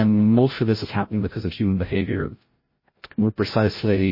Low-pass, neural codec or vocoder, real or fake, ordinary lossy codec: 5.4 kHz; codec, 16 kHz, 0.5 kbps, FreqCodec, larger model; fake; MP3, 24 kbps